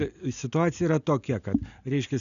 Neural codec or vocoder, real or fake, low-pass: none; real; 7.2 kHz